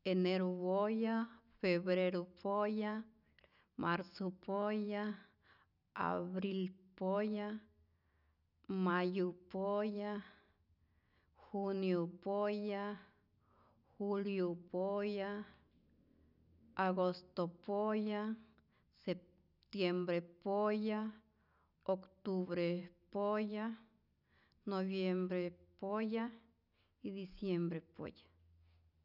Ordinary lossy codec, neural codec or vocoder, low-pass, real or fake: none; none; 5.4 kHz; real